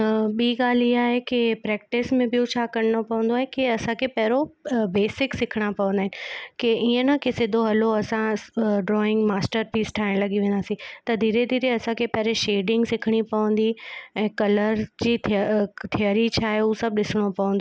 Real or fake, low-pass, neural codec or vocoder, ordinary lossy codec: real; none; none; none